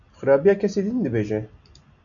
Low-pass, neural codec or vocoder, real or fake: 7.2 kHz; none; real